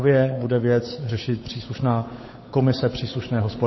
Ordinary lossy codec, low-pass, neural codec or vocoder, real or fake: MP3, 24 kbps; 7.2 kHz; codec, 16 kHz, 8 kbps, FunCodec, trained on Chinese and English, 25 frames a second; fake